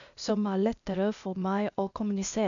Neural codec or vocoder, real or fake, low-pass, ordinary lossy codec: codec, 16 kHz, 0.8 kbps, ZipCodec; fake; 7.2 kHz; none